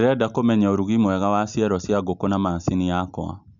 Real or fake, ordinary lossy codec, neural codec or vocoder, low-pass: real; none; none; 7.2 kHz